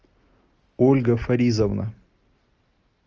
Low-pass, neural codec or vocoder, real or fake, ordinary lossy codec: 7.2 kHz; none; real; Opus, 24 kbps